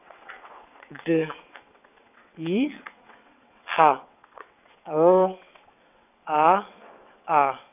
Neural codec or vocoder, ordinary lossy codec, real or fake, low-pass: vocoder, 22.05 kHz, 80 mel bands, WaveNeXt; none; fake; 3.6 kHz